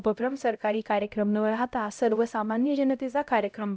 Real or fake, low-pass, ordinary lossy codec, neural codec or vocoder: fake; none; none; codec, 16 kHz, 0.5 kbps, X-Codec, HuBERT features, trained on LibriSpeech